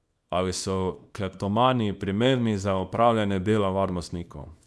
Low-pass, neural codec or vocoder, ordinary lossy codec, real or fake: none; codec, 24 kHz, 0.9 kbps, WavTokenizer, small release; none; fake